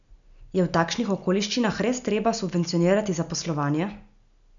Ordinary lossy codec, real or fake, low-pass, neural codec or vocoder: none; real; 7.2 kHz; none